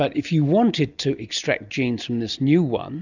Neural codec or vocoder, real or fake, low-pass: none; real; 7.2 kHz